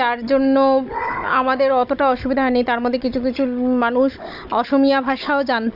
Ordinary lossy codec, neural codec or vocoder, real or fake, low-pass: AAC, 48 kbps; codec, 16 kHz, 16 kbps, FunCodec, trained on Chinese and English, 50 frames a second; fake; 5.4 kHz